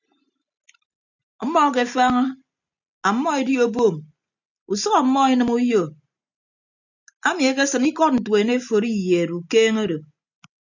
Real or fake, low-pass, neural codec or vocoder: real; 7.2 kHz; none